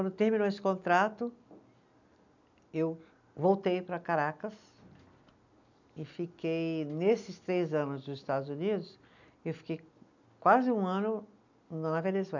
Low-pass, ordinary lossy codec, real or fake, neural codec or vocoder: 7.2 kHz; none; real; none